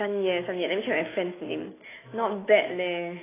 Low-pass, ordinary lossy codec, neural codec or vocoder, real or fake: 3.6 kHz; AAC, 16 kbps; autoencoder, 48 kHz, 128 numbers a frame, DAC-VAE, trained on Japanese speech; fake